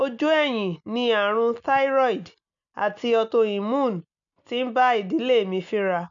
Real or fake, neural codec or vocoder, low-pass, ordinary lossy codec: real; none; 7.2 kHz; none